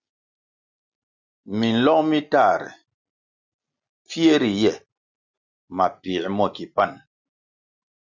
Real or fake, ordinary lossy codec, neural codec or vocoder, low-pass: real; Opus, 64 kbps; none; 7.2 kHz